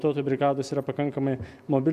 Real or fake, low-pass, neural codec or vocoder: real; 14.4 kHz; none